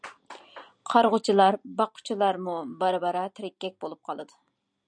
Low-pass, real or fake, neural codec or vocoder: 9.9 kHz; real; none